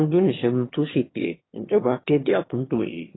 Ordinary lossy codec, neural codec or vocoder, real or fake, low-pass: AAC, 16 kbps; autoencoder, 22.05 kHz, a latent of 192 numbers a frame, VITS, trained on one speaker; fake; 7.2 kHz